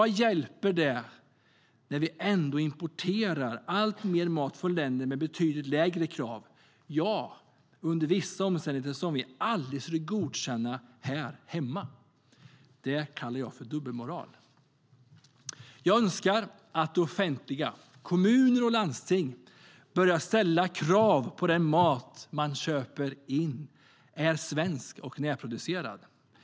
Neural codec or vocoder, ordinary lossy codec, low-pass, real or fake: none; none; none; real